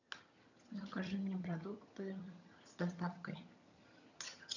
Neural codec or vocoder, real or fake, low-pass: vocoder, 22.05 kHz, 80 mel bands, HiFi-GAN; fake; 7.2 kHz